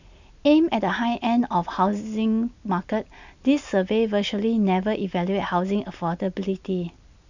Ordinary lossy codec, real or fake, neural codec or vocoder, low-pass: none; real; none; 7.2 kHz